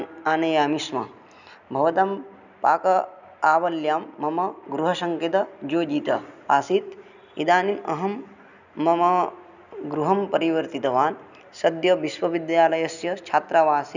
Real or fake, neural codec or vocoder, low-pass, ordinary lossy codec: real; none; 7.2 kHz; none